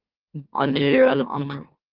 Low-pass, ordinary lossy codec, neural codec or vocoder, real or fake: 5.4 kHz; Opus, 32 kbps; autoencoder, 44.1 kHz, a latent of 192 numbers a frame, MeloTTS; fake